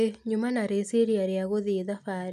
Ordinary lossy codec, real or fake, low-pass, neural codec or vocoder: none; real; 14.4 kHz; none